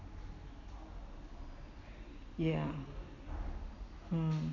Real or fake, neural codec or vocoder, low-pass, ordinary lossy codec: real; none; 7.2 kHz; none